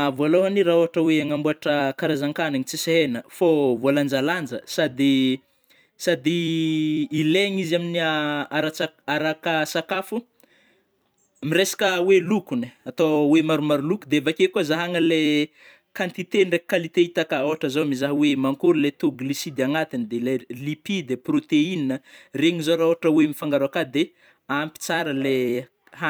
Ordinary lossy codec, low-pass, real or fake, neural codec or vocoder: none; none; fake; vocoder, 44.1 kHz, 128 mel bands every 256 samples, BigVGAN v2